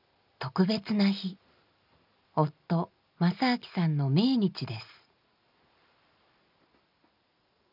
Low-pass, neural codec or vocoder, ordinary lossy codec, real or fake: 5.4 kHz; none; none; real